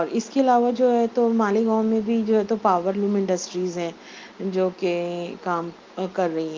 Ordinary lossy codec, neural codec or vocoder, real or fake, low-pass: Opus, 24 kbps; none; real; 7.2 kHz